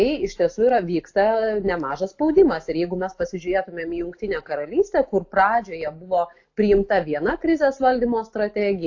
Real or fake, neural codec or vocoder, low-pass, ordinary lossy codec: real; none; 7.2 kHz; AAC, 48 kbps